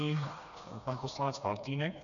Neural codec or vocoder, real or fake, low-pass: codec, 16 kHz, 2 kbps, FreqCodec, smaller model; fake; 7.2 kHz